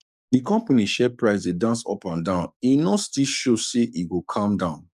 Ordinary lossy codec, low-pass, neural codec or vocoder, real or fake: none; 14.4 kHz; codec, 44.1 kHz, 7.8 kbps, DAC; fake